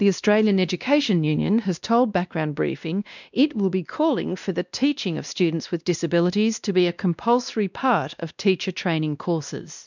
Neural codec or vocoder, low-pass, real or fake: codec, 16 kHz, 1 kbps, X-Codec, WavLM features, trained on Multilingual LibriSpeech; 7.2 kHz; fake